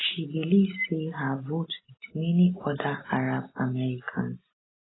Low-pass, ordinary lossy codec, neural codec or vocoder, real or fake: 7.2 kHz; AAC, 16 kbps; none; real